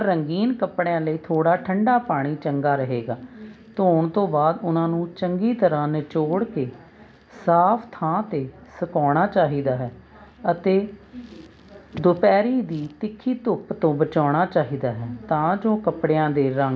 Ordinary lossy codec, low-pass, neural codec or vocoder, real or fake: none; none; none; real